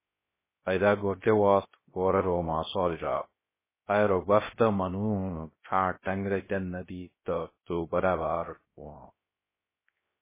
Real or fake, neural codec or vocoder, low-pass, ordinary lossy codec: fake; codec, 16 kHz, 0.3 kbps, FocalCodec; 3.6 kHz; MP3, 16 kbps